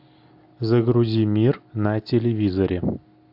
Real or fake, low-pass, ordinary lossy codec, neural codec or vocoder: real; 5.4 kHz; AAC, 48 kbps; none